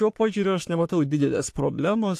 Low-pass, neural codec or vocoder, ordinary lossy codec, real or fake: 14.4 kHz; codec, 44.1 kHz, 3.4 kbps, Pupu-Codec; AAC, 64 kbps; fake